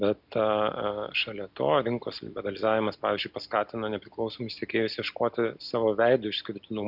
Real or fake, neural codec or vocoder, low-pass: real; none; 5.4 kHz